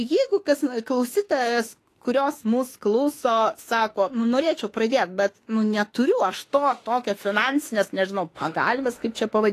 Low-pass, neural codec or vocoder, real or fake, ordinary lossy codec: 14.4 kHz; autoencoder, 48 kHz, 32 numbers a frame, DAC-VAE, trained on Japanese speech; fake; AAC, 48 kbps